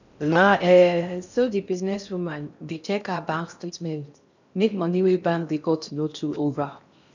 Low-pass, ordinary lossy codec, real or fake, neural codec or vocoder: 7.2 kHz; none; fake; codec, 16 kHz in and 24 kHz out, 0.6 kbps, FocalCodec, streaming, 4096 codes